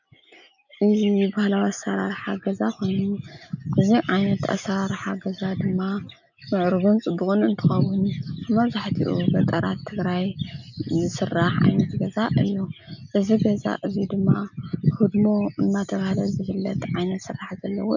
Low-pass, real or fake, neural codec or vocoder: 7.2 kHz; real; none